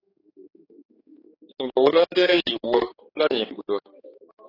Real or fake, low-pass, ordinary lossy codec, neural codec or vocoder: fake; 5.4 kHz; AAC, 24 kbps; codec, 16 kHz, 4 kbps, X-Codec, HuBERT features, trained on general audio